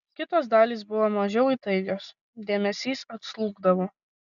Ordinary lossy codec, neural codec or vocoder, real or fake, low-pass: MP3, 96 kbps; none; real; 7.2 kHz